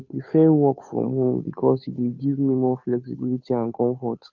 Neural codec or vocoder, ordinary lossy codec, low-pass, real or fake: codec, 16 kHz, 2 kbps, FunCodec, trained on Chinese and English, 25 frames a second; none; 7.2 kHz; fake